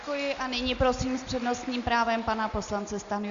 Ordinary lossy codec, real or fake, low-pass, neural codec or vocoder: Opus, 64 kbps; real; 7.2 kHz; none